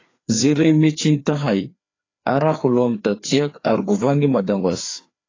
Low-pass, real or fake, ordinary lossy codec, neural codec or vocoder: 7.2 kHz; fake; AAC, 32 kbps; codec, 16 kHz, 2 kbps, FreqCodec, larger model